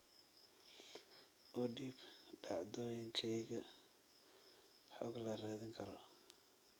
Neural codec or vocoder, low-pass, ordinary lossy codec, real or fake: codec, 44.1 kHz, 7.8 kbps, DAC; none; none; fake